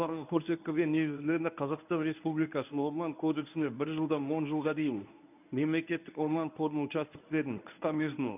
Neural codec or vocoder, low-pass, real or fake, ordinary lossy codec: codec, 24 kHz, 0.9 kbps, WavTokenizer, medium speech release version 1; 3.6 kHz; fake; none